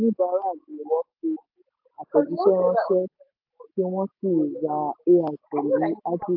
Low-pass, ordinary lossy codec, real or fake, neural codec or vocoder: 5.4 kHz; AAC, 48 kbps; real; none